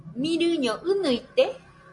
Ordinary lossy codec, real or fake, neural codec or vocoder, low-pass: MP3, 48 kbps; real; none; 10.8 kHz